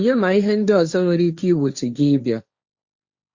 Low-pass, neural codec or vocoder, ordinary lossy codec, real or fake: 7.2 kHz; codec, 16 kHz, 1.1 kbps, Voila-Tokenizer; Opus, 64 kbps; fake